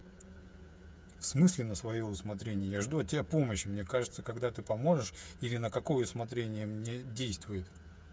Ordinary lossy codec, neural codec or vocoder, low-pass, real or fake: none; codec, 16 kHz, 8 kbps, FreqCodec, smaller model; none; fake